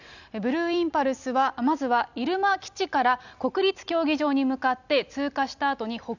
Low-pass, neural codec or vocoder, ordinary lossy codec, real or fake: 7.2 kHz; none; none; real